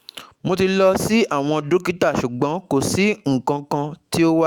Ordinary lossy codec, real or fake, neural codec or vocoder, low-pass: Opus, 64 kbps; fake; autoencoder, 48 kHz, 128 numbers a frame, DAC-VAE, trained on Japanese speech; 19.8 kHz